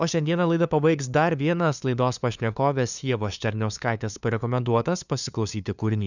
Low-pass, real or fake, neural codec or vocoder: 7.2 kHz; fake; codec, 16 kHz, 2 kbps, FunCodec, trained on LibriTTS, 25 frames a second